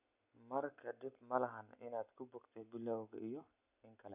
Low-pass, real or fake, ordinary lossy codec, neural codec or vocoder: 3.6 kHz; real; none; none